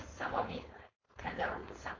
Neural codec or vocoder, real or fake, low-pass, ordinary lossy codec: codec, 16 kHz, 4.8 kbps, FACodec; fake; 7.2 kHz; AAC, 32 kbps